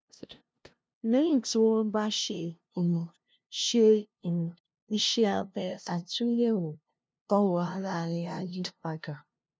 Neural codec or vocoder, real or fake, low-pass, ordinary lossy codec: codec, 16 kHz, 0.5 kbps, FunCodec, trained on LibriTTS, 25 frames a second; fake; none; none